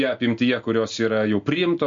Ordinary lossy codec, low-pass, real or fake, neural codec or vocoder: MP3, 48 kbps; 7.2 kHz; real; none